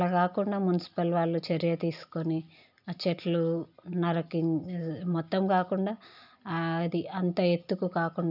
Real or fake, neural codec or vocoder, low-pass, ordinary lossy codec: real; none; 5.4 kHz; none